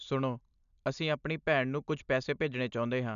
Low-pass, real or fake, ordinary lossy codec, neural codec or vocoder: 7.2 kHz; real; none; none